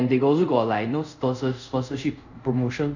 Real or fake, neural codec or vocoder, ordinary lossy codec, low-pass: fake; codec, 24 kHz, 0.5 kbps, DualCodec; none; 7.2 kHz